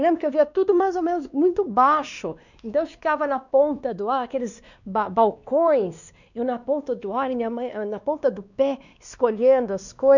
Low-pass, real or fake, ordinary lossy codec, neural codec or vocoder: 7.2 kHz; fake; none; codec, 16 kHz, 2 kbps, X-Codec, WavLM features, trained on Multilingual LibriSpeech